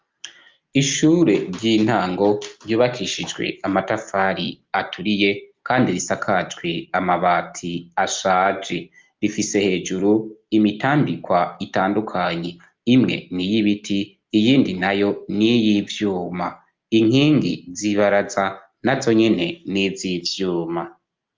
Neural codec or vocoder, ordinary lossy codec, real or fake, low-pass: none; Opus, 24 kbps; real; 7.2 kHz